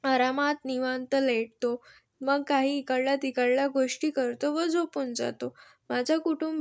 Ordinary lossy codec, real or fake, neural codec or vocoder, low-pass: none; real; none; none